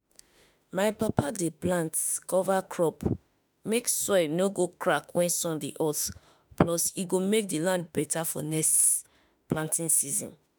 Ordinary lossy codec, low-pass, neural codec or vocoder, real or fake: none; none; autoencoder, 48 kHz, 32 numbers a frame, DAC-VAE, trained on Japanese speech; fake